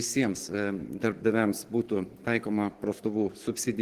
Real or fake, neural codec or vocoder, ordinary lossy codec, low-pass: fake; codec, 44.1 kHz, 7.8 kbps, DAC; Opus, 16 kbps; 14.4 kHz